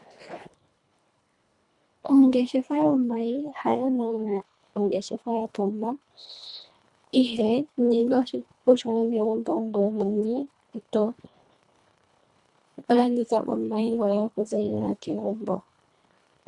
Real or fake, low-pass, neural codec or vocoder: fake; 10.8 kHz; codec, 24 kHz, 1.5 kbps, HILCodec